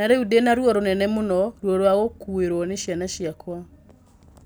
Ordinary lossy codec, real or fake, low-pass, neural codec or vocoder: none; real; none; none